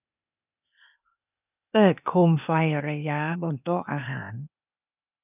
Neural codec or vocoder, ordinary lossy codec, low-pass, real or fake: codec, 16 kHz, 0.8 kbps, ZipCodec; none; 3.6 kHz; fake